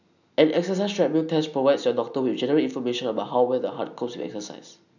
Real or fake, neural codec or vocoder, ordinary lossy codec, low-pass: real; none; none; 7.2 kHz